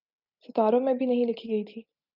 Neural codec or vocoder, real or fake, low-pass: none; real; 5.4 kHz